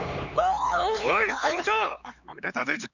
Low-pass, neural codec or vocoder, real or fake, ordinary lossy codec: 7.2 kHz; codec, 16 kHz, 4 kbps, X-Codec, HuBERT features, trained on LibriSpeech; fake; none